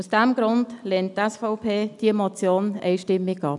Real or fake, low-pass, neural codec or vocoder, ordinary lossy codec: real; 10.8 kHz; none; none